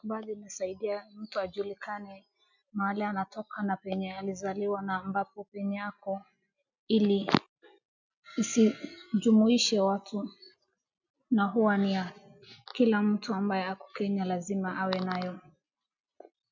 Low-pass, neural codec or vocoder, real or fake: 7.2 kHz; none; real